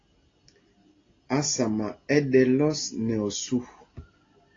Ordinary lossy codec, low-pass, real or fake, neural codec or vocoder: AAC, 32 kbps; 7.2 kHz; real; none